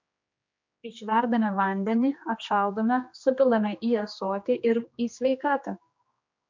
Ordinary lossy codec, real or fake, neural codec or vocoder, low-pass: MP3, 48 kbps; fake; codec, 16 kHz, 2 kbps, X-Codec, HuBERT features, trained on general audio; 7.2 kHz